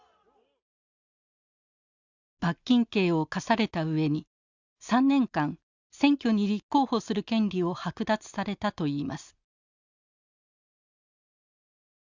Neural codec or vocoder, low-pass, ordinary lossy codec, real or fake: none; 7.2 kHz; Opus, 64 kbps; real